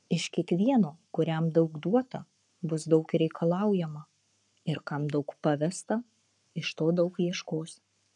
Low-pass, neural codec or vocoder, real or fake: 10.8 kHz; codec, 44.1 kHz, 7.8 kbps, Pupu-Codec; fake